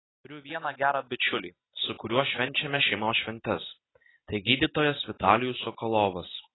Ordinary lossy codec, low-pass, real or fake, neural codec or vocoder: AAC, 16 kbps; 7.2 kHz; real; none